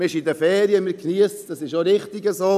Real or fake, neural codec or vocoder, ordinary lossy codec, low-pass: real; none; none; 14.4 kHz